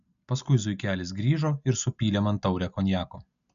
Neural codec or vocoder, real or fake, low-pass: none; real; 7.2 kHz